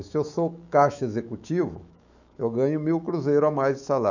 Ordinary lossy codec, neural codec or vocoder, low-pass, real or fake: none; autoencoder, 48 kHz, 128 numbers a frame, DAC-VAE, trained on Japanese speech; 7.2 kHz; fake